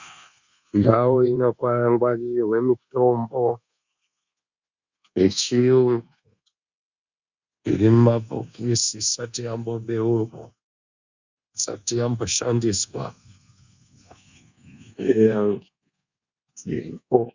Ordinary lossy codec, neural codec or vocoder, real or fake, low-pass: Opus, 64 kbps; codec, 24 kHz, 1.2 kbps, DualCodec; fake; 7.2 kHz